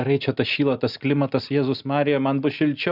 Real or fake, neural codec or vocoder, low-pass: real; none; 5.4 kHz